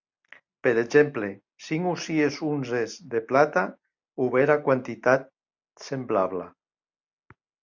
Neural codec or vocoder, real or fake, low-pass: none; real; 7.2 kHz